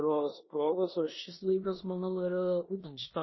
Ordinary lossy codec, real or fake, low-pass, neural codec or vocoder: MP3, 24 kbps; fake; 7.2 kHz; codec, 16 kHz in and 24 kHz out, 0.9 kbps, LongCat-Audio-Codec, four codebook decoder